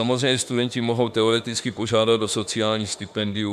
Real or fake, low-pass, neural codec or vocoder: fake; 14.4 kHz; autoencoder, 48 kHz, 32 numbers a frame, DAC-VAE, trained on Japanese speech